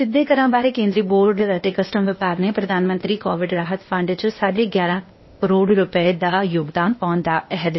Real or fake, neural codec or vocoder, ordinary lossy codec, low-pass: fake; codec, 16 kHz, 0.8 kbps, ZipCodec; MP3, 24 kbps; 7.2 kHz